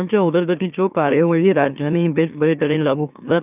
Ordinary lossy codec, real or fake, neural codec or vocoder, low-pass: none; fake; autoencoder, 44.1 kHz, a latent of 192 numbers a frame, MeloTTS; 3.6 kHz